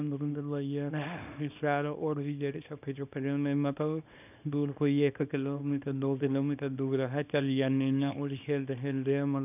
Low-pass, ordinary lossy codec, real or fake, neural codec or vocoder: 3.6 kHz; none; fake; codec, 24 kHz, 0.9 kbps, WavTokenizer, small release